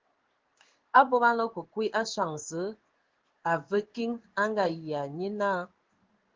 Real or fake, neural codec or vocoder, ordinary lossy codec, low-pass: fake; codec, 16 kHz in and 24 kHz out, 1 kbps, XY-Tokenizer; Opus, 16 kbps; 7.2 kHz